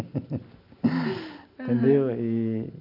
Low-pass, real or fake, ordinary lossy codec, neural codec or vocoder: 5.4 kHz; real; none; none